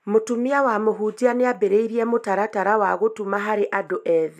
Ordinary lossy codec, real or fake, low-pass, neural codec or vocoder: none; real; 14.4 kHz; none